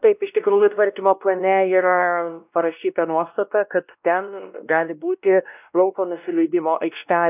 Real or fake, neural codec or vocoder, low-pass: fake; codec, 16 kHz, 1 kbps, X-Codec, WavLM features, trained on Multilingual LibriSpeech; 3.6 kHz